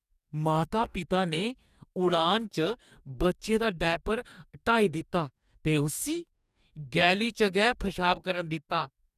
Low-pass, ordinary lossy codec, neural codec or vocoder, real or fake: 14.4 kHz; none; codec, 44.1 kHz, 2.6 kbps, DAC; fake